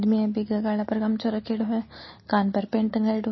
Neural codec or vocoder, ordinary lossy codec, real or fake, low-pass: none; MP3, 24 kbps; real; 7.2 kHz